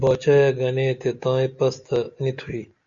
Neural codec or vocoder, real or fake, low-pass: none; real; 7.2 kHz